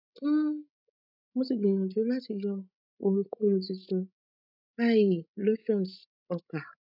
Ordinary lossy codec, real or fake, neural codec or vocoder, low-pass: none; fake; codec, 16 kHz, 16 kbps, FreqCodec, larger model; 5.4 kHz